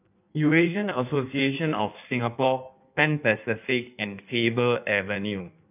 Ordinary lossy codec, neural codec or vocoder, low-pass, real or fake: none; codec, 16 kHz in and 24 kHz out, 1.1 kbps, FireRedTTS-2 codec; 3.6 kHz; fake